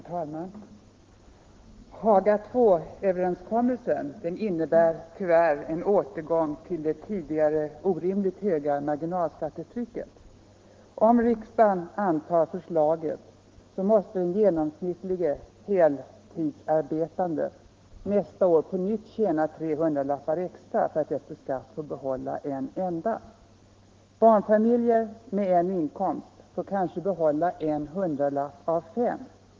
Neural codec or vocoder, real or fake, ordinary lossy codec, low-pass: autoencoder, 48 kHz, 128 numbers a frame, DAC-VAE, trained on Japanese speech; fake; Opus, 16 kbps; 7.2 kHz